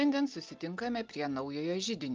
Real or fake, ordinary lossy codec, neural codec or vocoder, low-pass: real; Opus, 24 kbps; none; 7.2 kHz